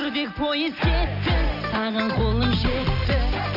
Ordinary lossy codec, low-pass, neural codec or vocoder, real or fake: none; 5.4 kHz; none; real